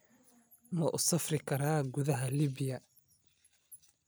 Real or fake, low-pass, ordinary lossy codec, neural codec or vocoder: real; none; none; none